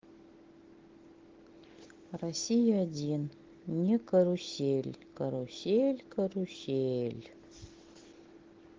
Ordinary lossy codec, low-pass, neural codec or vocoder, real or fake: Opus, 16 kbps; 7.2 kHz; none; real